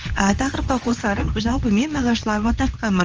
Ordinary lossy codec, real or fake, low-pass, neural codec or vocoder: Opus, 24 kbps; fake; 7.2 kHz; codec, 24 kHz, 0.9 kbps, WavTokenizer, medium speech release version 2